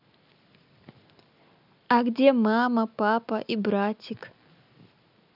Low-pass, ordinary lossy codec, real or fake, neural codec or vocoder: 5.4 kHz; none; real; none